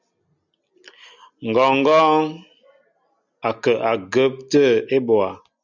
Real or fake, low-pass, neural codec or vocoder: real; 7.2 kHz; none